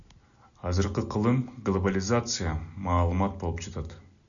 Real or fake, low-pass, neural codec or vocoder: real; 7.2 kHz; none